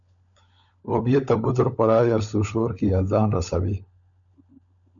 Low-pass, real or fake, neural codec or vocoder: 7.2 kHz; fake; codec, 16 kHz, 16 kbps, FunCodec, trained on LibriTTS, 50 frames a second